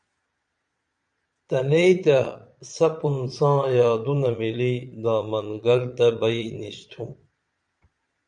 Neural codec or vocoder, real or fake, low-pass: vocoder, 22.05 kHz, 80 mel bands, Vocos; fake; 9.9 kHz